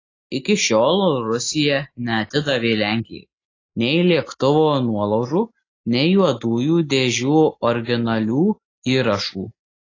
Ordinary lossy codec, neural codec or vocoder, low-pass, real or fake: AAC, 32 kbps; none; 7.2 kHz; real